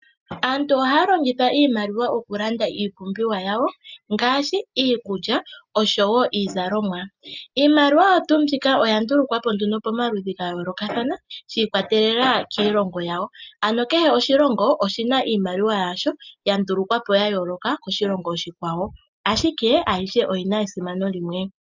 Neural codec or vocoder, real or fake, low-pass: none; real; 7.2 kHz